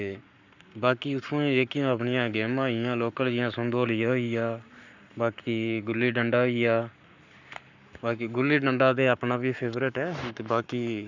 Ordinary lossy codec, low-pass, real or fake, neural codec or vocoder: none; 7.2 kHz; fake; codec, 44.1 kHz, 7.8 kbps, Pupu-Codec